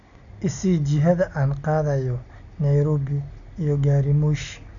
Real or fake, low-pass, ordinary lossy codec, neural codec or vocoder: real; 7.2 kHz; none; none